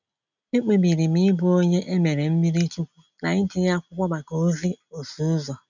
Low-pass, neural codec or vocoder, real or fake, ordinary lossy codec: 7.2 kHz; none; real; none